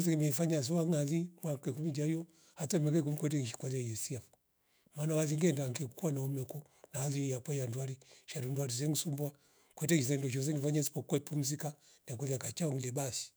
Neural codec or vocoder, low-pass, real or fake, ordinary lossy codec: autoencoder, 48 kHz, 128 numbers a frame, DAC-VAE, trained on Japanese speech; none; fake; none